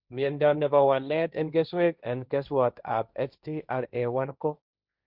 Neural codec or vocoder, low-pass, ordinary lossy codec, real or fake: codec, 16 kHz, 1.1 kbps, Voila-Tokenizer; 5.4 kHz; Opus, 64 kbps; fake